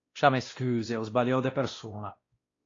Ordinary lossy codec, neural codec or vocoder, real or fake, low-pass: AAC, 32 kbps; codec, 16 kHz, 1 kbps, X-Codec, WavLM features, trained on Multilingual LibriSpeech; fake; 7.2 kHz